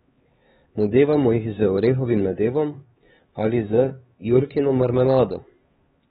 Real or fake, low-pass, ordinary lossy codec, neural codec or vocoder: fake; 7.2 kHz; AAC, 16 kbps; codec, 16 kHz, 4 kbps, X-Codec, HuBERT features, trained on LibriSpeech